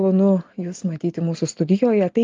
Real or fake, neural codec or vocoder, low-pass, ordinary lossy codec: real; none; 7.2 kHz; Opus, 32 kbps